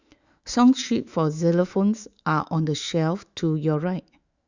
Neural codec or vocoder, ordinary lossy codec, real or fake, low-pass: autoencoder, 48 kHz, 128 numbers a frame, DAC-VAE, trained on Japanese speech; Opus, 64 kbps; fake; 7.2 kHz